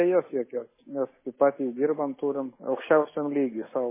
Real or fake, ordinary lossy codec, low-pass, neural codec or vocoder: real; MP3, 16 kbps; 3.6 kHz; none